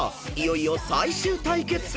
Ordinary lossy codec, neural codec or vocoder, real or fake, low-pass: none; none; real; none